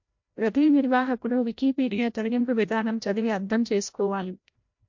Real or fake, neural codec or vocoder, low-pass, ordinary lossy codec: fake; codec, 16 kHz, 0.5 kbps, FreqCodec, larger model; 7.2 kHz; MP3, 48 kbps